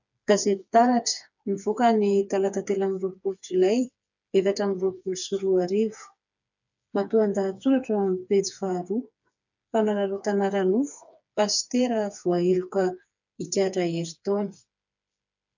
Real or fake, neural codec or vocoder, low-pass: fake; codec, 16 kHz, 4 kbps, FreqCodec, smaller model; 7.2 kHz